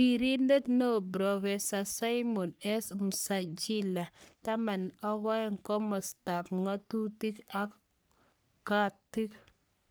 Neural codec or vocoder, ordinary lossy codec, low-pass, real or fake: codec, 44.1 kHz, 3.4 kbps, Pupu-Codec; none; none; fake